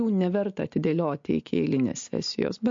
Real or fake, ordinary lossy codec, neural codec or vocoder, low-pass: real; MP3, 48 kbps; none; 7.2 kHz